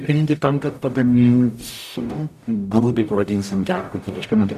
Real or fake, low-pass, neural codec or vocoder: fake; 14.4 kHz; codec, 44.1 kHz, 0.9 kbps, DAC